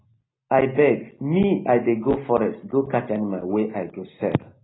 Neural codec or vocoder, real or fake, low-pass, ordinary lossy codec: none; real; 7.2 kHz; AAC, 16 kbps